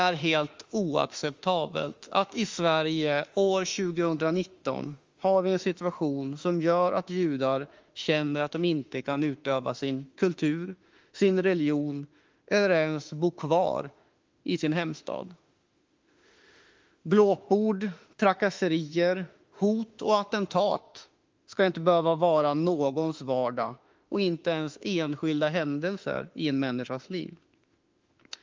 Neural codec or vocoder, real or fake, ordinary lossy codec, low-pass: autoencoder, 48 kHz, 32 numbers a frame, DAC-VAE, trained on Japanese speech; fake; Opus, 32 kbps; 7.2 kHz